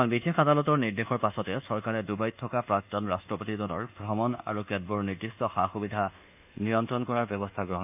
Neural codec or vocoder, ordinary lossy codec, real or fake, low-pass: codec, 24 kHz, 1.2 kbps, DualCodec; none; fake; 3.6 kHz